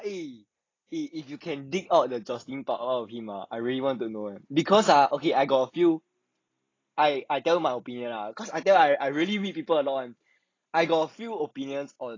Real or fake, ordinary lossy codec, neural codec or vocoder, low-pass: real; AAC, 32 kbps; none; 7.2 kHz